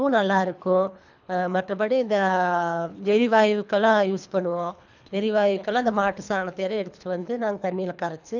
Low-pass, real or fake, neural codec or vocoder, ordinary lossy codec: 7.2 kHz; fake; codec, 24 kHz, 3 kbps, HILCodec; none